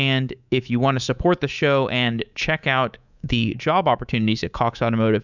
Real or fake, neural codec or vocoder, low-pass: fake; codec, 24 kHz, 3.1 kbps, DualCodec; 7.2 kHz